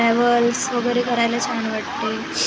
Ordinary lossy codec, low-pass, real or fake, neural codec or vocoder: none; none; real; none